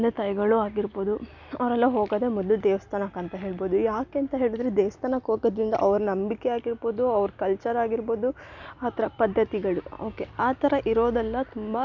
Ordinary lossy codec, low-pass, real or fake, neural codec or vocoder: none; 7.2 kHz; real; none